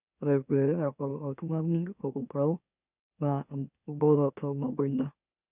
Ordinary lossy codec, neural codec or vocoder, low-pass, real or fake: none; autoencoder, 44.1 kHz, a latent of 192 numbers a frame, MeloTTS; 3.6 kHz; fake